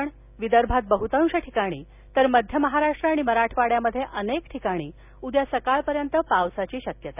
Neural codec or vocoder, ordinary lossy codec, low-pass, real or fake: none; none; 3.6 kHz; real